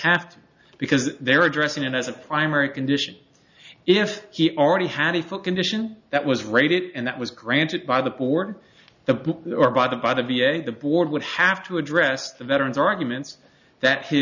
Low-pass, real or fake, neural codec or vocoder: 7.2 kHz; real; none